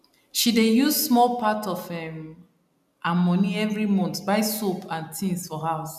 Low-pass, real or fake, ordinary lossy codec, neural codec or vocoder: 14.4 kHz; real; none; none